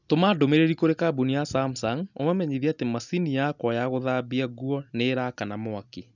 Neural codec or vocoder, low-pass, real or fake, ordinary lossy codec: none; 7.2 kHz; real; none